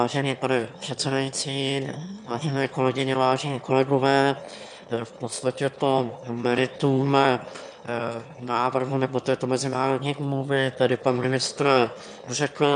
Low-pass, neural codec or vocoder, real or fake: 9.9 kHz; autoencoder, 22.05 kHz, a latent of 192 numbers a frame, VITS, trained on one speaker; fake